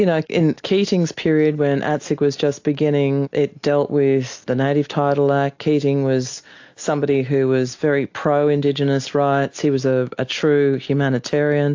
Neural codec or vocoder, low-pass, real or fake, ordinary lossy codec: none; 7.2 kHz; real; AAC, 48 kbps